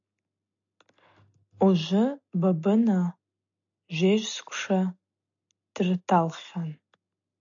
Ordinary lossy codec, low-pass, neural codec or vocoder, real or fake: AAC, 64 kbps; 7.2 kHz; none; real